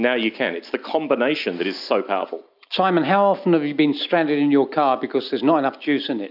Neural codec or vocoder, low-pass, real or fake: none; 5.4 kHz; real